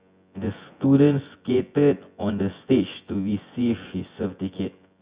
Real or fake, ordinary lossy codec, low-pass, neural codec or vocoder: fake; Opus, 64 kbps; 3.6 kHz; vocoder, 24 kHz, 100 mel bands, Vocos